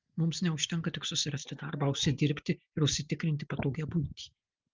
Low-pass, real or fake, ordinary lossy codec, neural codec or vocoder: 7.2 kHz; real; Opus, 32 kbps; none